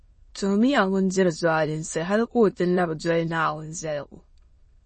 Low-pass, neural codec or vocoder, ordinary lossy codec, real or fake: 9.9 kHz; autoencoder, 22.05 kHz, a latent of 192 numbers a frame, VITS, trained on many speakers; MP3, 32 kbps; fake